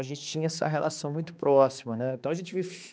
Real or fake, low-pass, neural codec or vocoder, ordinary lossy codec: fake; none; codec, 16 kHz, 2 kbps, X-Codec, HuBERT features, trained on balanced general audio; none